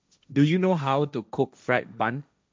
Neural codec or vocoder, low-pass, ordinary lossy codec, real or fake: codec, 16 kHz, 1.1 kbps, Voila-Tokenizer; none; none; fake